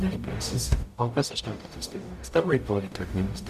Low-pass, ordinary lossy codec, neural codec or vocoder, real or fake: 14.4 kHz; Opus, 64 kbps; codec, 44.1 kHz, 0.9 kbps, DAC; fake